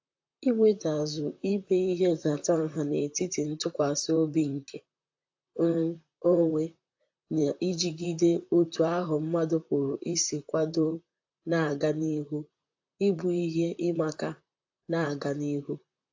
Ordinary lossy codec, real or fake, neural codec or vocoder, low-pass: AAC, 48 kbps; fake; vocoder, 44.1 kHz, 128 mel bands, Pupu-Vocoder; 7.2 kHz